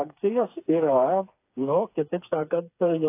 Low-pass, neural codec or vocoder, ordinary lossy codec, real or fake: 3.6 kHz; codec, 16 kHz, 4 kbps, FreqCodec, smaller model; AAC, 24 kbps; fake